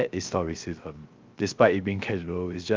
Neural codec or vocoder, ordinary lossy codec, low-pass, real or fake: codec, 16 kHz, 0.3 kbps, FocalCodec; Opus, 24 kbps; 7.2 kHz; fake